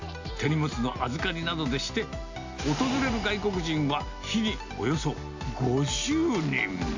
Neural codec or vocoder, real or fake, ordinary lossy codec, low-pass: none; real; AAC, 48 kbps; 7.2 kHz